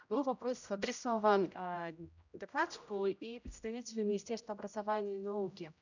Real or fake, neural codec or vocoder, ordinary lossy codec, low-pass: fake; codec, 16 kHz, 0.5 kbps, X-Codec, HuBERT features, trained on general audio; none; 7.2 kHz